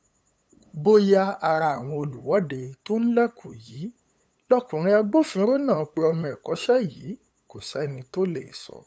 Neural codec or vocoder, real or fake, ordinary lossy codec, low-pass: codec, 16 kHz, 8 kbps, FunCodec, trained on LibriTTS, 25 frames a second; fake; none; none